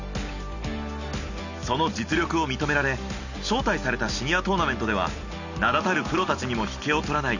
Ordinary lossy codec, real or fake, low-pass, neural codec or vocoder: none; real; 7.2 kHz; none